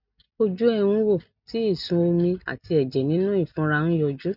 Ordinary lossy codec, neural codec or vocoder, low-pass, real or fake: none; none; 5.4 kHz; real